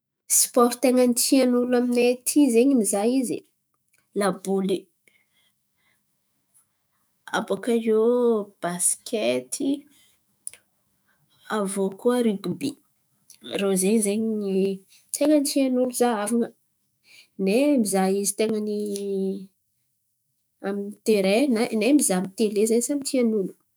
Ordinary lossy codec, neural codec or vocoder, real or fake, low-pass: none; autoencoder, 48 kHz, 128 numbers a frame, DAC-VAE, trained on Japanese speech; fake; none